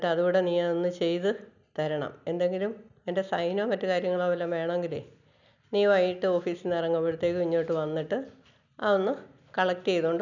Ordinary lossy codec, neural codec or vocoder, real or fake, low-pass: none; none; real; 7.2 kHz